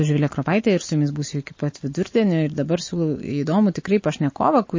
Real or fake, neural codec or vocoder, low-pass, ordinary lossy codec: real; none; 7.2 kHz; MP3, 32 kbps